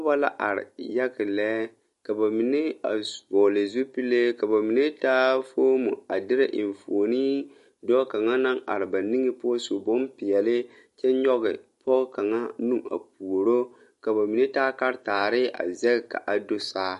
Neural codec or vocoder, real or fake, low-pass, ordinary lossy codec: none; real; 14.4 kHz; MP3, 48 kbps